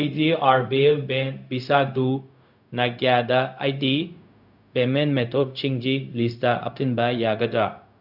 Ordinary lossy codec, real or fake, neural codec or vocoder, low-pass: none; fake; codec, 16 kHz, 0.4 kbps, LongCat-Audio-Codec; 5.4 kHz